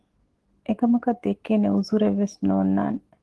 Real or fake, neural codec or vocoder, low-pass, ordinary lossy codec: fake; autoencoder, 48 kHz, 128 numbers a frame, DAC-VAE, trained on Japanese speech; 10.8 kHz; Opus, 16 kbps